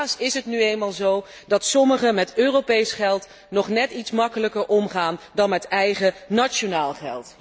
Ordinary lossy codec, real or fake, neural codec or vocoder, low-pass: none; real; none; none